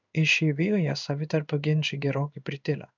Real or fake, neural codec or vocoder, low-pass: fake; codec, 16 kHz in and 24 kHz out, 1 kbps, XY-Tokenizer; 7.2 kHz